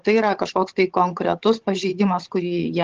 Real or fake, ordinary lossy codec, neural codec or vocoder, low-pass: fake; Opus, 16 kbps; codec, 16 kHz, 16 kbps, FunCodec, trained on Chinese and English, 50 frames a second; 7.2 kHz